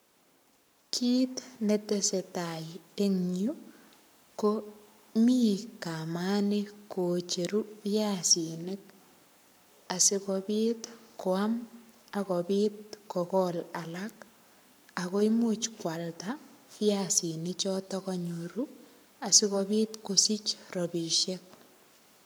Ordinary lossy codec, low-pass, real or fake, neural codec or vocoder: none; none; fake; codec, 44.1 kHz, 7.8 kbps, Pupu-Codec